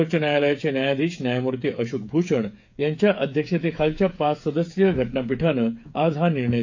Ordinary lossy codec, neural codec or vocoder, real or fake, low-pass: AAC, 48 kbps; codec, 16 kHz, 8 kbps, FreqCodec, smaller model; fake; 7.2 kHz